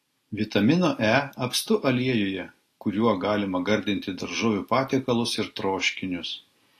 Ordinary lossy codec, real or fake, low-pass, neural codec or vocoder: AAC, 48 kbps; real; 14.4 kHz; none